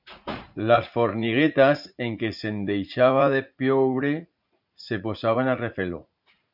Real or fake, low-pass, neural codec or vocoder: fake; 5.4 kHz; vocoder, 44.1 kHz, 80 mel bands, Vocos